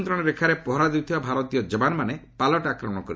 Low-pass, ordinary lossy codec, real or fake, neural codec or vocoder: none; none; real; none